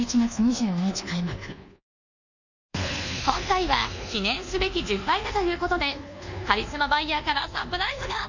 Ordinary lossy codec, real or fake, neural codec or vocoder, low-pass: none; fake; codec, 24 kHz, 1.2 kbps, DualCodec; 7.2 kHz